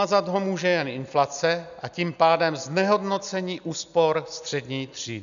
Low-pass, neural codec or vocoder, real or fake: 7.2 kHz; none; real